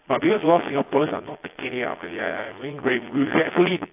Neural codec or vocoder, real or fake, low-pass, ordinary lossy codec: vocoder, 22.05 kHz, 80 mel bands, Vocos; fake; 3.6 kHz; AAC, 24 kbps